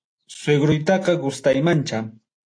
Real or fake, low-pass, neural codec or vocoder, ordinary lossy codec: real; 9.9 kHz; none; AAC, 48 kbps